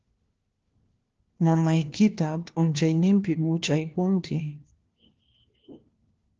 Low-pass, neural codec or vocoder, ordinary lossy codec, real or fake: 7.2 kHz; codec, 16 kHz, 1 kbps, FunCodec, trained on LibriTTS, 50 frames a second; Opus, 16 kbps; fake